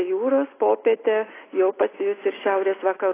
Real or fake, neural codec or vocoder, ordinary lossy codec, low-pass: real; none; AAC, 16 kbps; 3.6 kHz